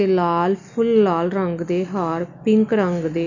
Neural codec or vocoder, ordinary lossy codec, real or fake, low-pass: none; none; real; 7.2 kHz